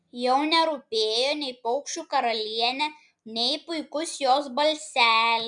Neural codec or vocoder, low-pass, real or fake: none; 9.9 kHz; real